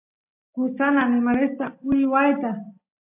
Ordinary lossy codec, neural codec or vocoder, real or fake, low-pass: MP3, 32 kbps; none; real; 3.6 kHz